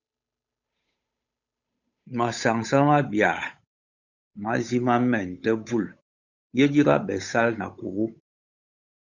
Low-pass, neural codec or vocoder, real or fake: 7.2 kHz; codec, 16 kHz, 8 kbps, FunCodec, trained on Chinese and English, 25 frames a second; fake